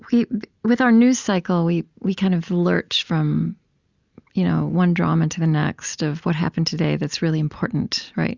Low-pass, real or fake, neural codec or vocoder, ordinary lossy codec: 7.2 kHz; real; none; Opus, 64 kbps